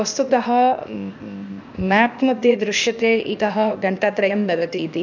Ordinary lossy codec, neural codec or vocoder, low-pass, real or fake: none; codec, 16 kHz, 0.8 kbps, ZipCodec; 7.2 kHz; fake